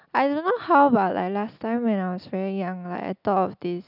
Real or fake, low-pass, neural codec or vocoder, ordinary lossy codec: real; 5.4 kHz; none; none